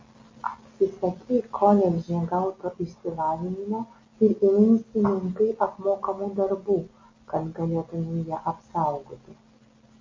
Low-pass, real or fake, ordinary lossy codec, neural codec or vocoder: 7.2 kHz; real; MP3, 32 kbps; none